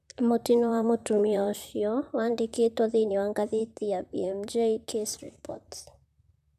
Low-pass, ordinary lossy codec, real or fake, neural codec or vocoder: 14.4 kHz; none; fake; vocoder, 44.1 kHz, 128 mel bands, Pupu-Vocoder